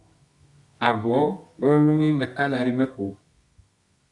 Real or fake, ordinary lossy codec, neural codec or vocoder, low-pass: fake; Opus, 64 kbps; codec, 24 kHz, 0.9 kbps, WavTokenizer, medium music audio release; 10.8 kHz